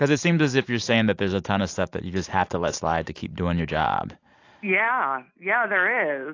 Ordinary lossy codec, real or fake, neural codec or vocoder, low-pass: AAC, 48 kbps; real; none; 7.2 kHz